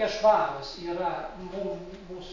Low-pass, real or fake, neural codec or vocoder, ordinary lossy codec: 7.2 kHz; real; none; AAC, 32 kbps